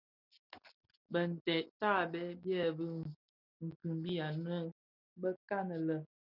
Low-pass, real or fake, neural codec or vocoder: 5.4 kHz; real; none